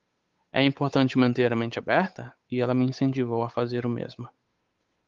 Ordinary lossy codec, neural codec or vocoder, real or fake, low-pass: Opus, 32 kbps; codec, 16 kHz, 8 kbps, FunCodec, trained on Chinese and English, 25 frames a second; fake; 7.2 kHz